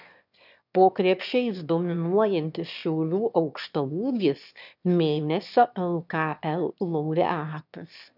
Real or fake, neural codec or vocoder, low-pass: fake; autoencoder, 22.05 kHz, a latent of 192 numbers a frame, VITS, trained on one speaker; 5.4 kHz